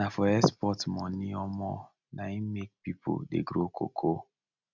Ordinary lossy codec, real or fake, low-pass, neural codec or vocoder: none; real; 7.2 kHz; none